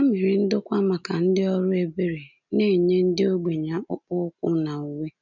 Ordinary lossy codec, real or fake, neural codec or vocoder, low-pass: none; real; none; 7.2 kHz